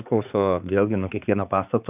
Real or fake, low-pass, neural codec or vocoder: fake; 3.6 kHz; codec, 16 kHz, 4 kbps, X-Codec, HuBERT features, trained on balanced general audio